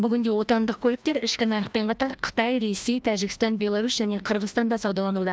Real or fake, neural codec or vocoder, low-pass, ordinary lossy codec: fake; codec, 16 kHz, 1 kbps, FreqCodec, larger model; none; none